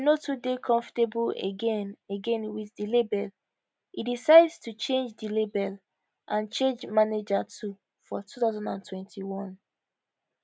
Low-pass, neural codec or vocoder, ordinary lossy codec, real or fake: none; none; none; real